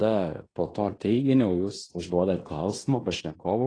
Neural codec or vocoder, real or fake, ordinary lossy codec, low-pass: codec, 16 kHz in and 24 kHz out, 0.9 kbps, LongCat-Audio-Codec, fine tuned four codebook decoder; fake; AAC, 32 kbps; 9.9 kHz